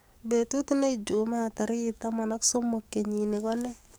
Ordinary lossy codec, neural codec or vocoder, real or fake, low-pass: none; codec, 44.1 kHz, 7.8 kbps, DAC; fake; none